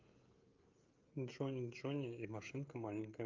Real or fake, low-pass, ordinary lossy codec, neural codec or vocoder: fake; 7.2 kHz; Opus, 24 kbps; codec, 16 kHz, 16 kbps, FreqCodec, smaller model